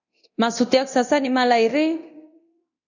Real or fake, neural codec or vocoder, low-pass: fake; codec, 24 kHz, 0.9 kbps, DualCodec; 7.2 kHz